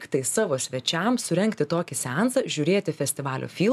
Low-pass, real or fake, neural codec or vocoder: 14.4 kHz; real; none